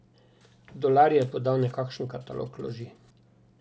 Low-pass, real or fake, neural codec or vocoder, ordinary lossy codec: none; real; none; none